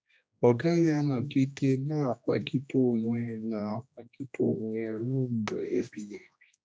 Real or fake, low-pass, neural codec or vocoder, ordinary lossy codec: fake; none; codec, 16 kHz, 1 kbps, X-Codec, HuBERT features, trained on general audio; none